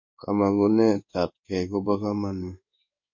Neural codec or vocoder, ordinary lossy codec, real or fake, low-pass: codec, 16 kHz in and 24 kHz out, 1 kbps, XY-Tokenizer; MP3, 48 kbps; fake; 7.2 kHz